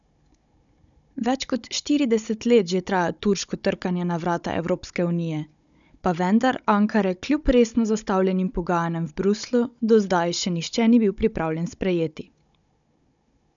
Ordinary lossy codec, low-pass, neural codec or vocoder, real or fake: none; 7.2 kHz; codec, 16 kHz, 16 kbps, FunCodec, trained on Chinese and English, 50 frames a second; fake